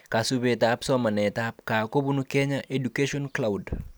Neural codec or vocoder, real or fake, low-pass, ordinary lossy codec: none; real; none; none